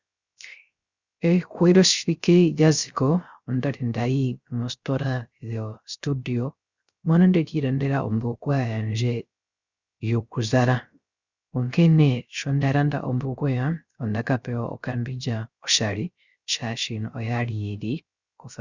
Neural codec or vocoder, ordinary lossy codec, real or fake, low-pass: codec, 16 kHz, 0.3 kbps, FocalCodec; Opus, 64 kbps; fake; 7.2 kHz